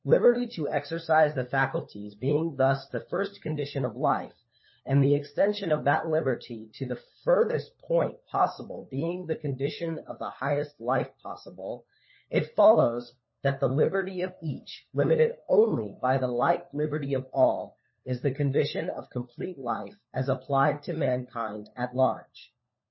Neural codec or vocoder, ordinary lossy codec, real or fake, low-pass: codec, 16 kHz, 4 kbps, FunCodec, trained on LibriTTS, 50 frames a second; MP3, 24 kbps; fake; 7.2 kHz